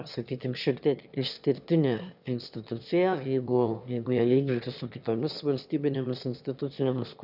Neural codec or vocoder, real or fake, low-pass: autoencoder, 22.05 kHz, a latent of 192 numbers a frame, VITS, trained on one speaker; fake; 5.4 kHz